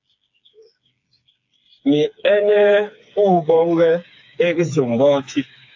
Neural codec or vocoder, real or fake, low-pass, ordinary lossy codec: codec, 16 kHz, 4 kbps, FreqCodec, smaller model; fake; 7.2 kHz; AAC, 48 kbps